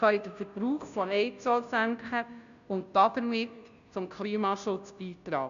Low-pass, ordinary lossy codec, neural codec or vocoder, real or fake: 7.2 kHz; none; codec, 16 kHz, 0.5 kbps, FunCodec, trained on Chinese and English, 25 frames a second; fake